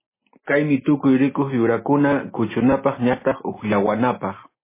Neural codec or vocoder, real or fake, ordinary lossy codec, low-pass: none; real; MP3, 16 kbps; 3.6 kHz